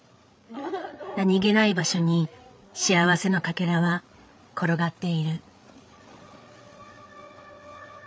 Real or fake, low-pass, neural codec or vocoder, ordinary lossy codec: fake; none; codec, 16 kHz, 8 kbps, FreqCodec, larger model; none